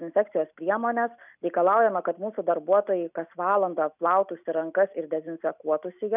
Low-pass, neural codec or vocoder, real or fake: 3.6 kHz; none; real